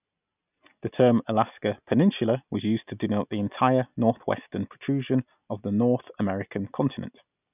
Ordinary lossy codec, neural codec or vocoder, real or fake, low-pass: none; none; real; 3.6 kHz